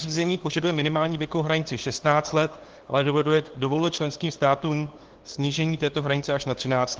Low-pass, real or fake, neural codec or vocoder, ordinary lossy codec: 7.2 kHz; fake; codec, 16 kHz, 2 kbps, FunCodec, trained on LibriTTS, 25 frames a second; Opus, 16 kbps